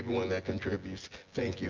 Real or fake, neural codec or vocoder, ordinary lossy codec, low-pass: fake; vocoder, 24 kHz, 100 mel bands, Vocos; Opus, 24 kbps; 7.2 kHz